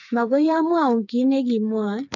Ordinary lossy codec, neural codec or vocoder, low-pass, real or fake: none; codec, 16 kHz, 4 kbps, FreqCodec, smaller model; 7.2 kHz; fake